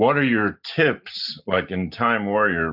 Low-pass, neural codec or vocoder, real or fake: 5.4 kHz; none; real